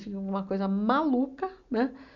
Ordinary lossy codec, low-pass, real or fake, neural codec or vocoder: none; 7.2 kHz; real; none